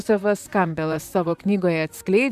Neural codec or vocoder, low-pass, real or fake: vocoder, 44.1 kHz, 128 mel bands, Pupu-Vocoder; 14.4 kHz; fake